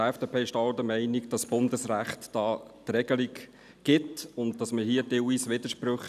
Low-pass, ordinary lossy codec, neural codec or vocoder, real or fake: 14.4 kHz; none; none; real